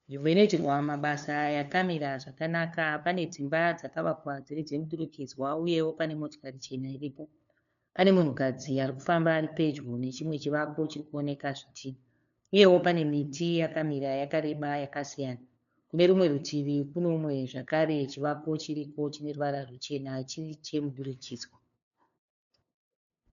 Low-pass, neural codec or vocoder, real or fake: 7.2 kHz; codec, 16 kHz, 2 kbps, FunCodec, trained on LibriTTS, 25 frames a second; fake